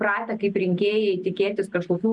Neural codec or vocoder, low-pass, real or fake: none; 10.8 kHz; real